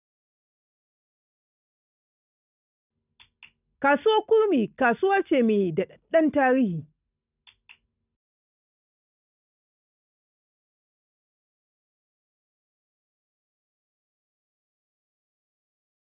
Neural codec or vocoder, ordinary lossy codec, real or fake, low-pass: none; none; real; 3.6 kHz